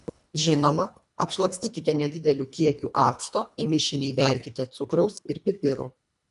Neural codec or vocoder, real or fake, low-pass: codec, 24 kHz, 1.5 kbps, HILCodec; fake; 10.8 kHz